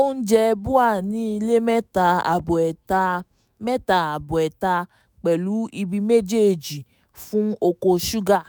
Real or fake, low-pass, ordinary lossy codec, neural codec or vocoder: fake; none; none; autoencoder, 48 kHz, 128 numbers a frame, DAC-VAE, trained on Japanese speech